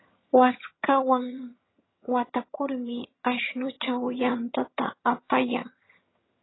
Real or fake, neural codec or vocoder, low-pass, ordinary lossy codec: fake; vocoder, 22.05 kHz, 80 mel bands, HiFi-GAN; 7.2 kHz; AAC, 16 kbps